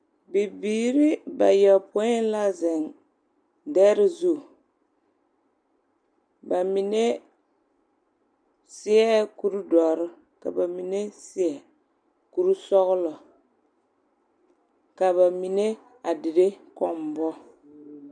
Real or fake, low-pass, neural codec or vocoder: real; 9.9 kHz; none